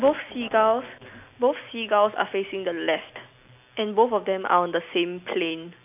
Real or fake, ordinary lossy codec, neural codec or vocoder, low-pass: real; none; none; 3.6 kHz